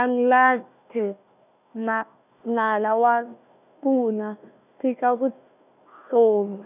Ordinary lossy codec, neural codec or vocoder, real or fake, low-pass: none; codec, 16 kHz, 1 kbps, FunCodec, trained on Chinese and English, 50 frames a second; fake; 3.6 kHz